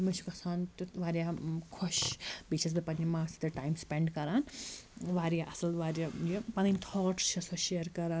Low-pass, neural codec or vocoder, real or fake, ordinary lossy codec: none; none; real; none